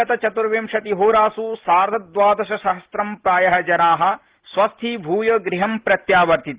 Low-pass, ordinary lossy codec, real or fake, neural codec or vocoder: 3.6 kHz; Opus, 16 kbps; real; none